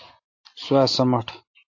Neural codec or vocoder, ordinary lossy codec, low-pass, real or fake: none; AAC, 48 kbps; 7.2 kHz; real